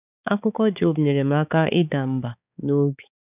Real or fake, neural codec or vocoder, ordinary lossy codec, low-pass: fake; codec, 16 kHz, 4 kbps, X-Codec, HuBERT features, trained on balanced general audio; none; 3.6 kHz